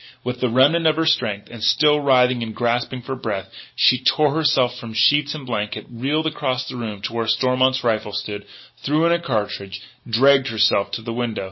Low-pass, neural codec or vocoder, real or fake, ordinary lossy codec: 7.2 kHz; none; real; MP3, 24 kbps